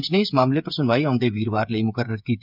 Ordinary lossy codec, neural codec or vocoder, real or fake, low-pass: none; codec, 16 kHz, 8 kbps, FreqCodec, smaller model; fake; 5.4 kHz